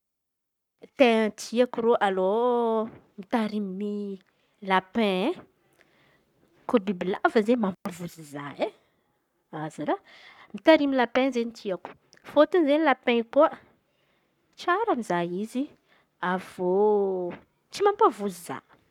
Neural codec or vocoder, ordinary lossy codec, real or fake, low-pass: codec, 44.1 kHz, 7.8 kbps, Pupu-Codec; none; fake; 19.8 kHz